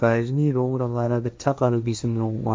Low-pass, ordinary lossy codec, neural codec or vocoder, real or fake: none; none; codec, 16 kHz, 1.1 kbps, Voila-Tokenizer; fake